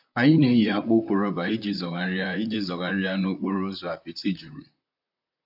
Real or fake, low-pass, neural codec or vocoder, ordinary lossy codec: fake; 5.4 kHz; vocoder, 44.1 kHz, 128 mel bands, Pupu-Vocoder; AAC, 48 kbps